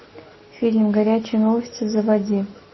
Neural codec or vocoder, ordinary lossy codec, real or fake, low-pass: none; MP3, 24 kbps; real; 7.2 kHz